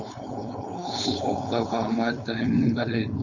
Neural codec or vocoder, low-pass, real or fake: codec, 16 kHz, 4.8 kbps, FACodec; 7.2 kHz; fake